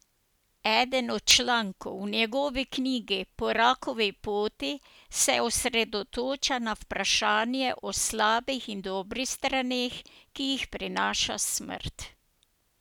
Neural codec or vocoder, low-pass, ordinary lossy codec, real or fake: none; none; none; real